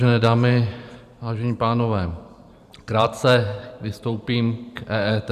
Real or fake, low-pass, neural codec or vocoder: fake; 14.4 kHz; vocoder, 44.1 kHz, 128 mel bands every 512 samples, BigVGAN v2